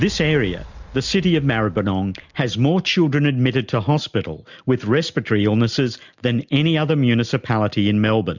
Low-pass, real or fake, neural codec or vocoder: 7.2 kHz; real; none